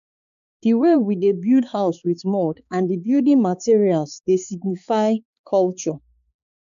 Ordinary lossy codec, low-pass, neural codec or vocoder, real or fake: none; 7.2 kHz; codec, 16 kHz, 4 kbps, X-Codec, HuBERT features, trained on balanced general audio; fake